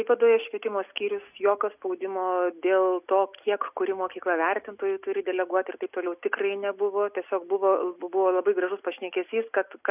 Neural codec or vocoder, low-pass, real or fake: none; 3.6 kHz; real